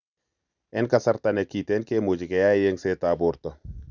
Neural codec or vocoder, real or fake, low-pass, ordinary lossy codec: none; real; 7.2 kHz; none